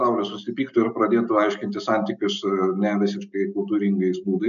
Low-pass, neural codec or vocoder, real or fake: 7.2 kHz; none; real